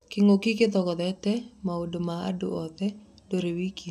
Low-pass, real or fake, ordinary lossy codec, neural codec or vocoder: 14.4 kHz; real; none; none